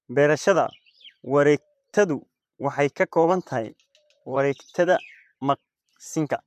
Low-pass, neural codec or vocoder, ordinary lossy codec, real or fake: 14.4 kHz; vocoder, 44.1 kHz, 128 mel bands, Pupu-Vocoder; MP3, 96 kbps; fake